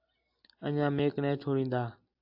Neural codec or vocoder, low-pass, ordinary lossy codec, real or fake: none; 5.4 kHz; AAC, 48 kbps; real